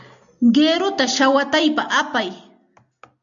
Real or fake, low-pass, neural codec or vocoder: real; 7.2 kHz; none